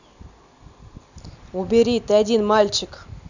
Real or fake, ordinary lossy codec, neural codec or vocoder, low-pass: real; none; none; 7.2 kHz